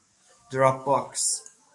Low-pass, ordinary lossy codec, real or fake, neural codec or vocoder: 10.8 kHz; MP3, 64 kbps; fake; codec, 44.1 kHz, 7.8 kbps, DAC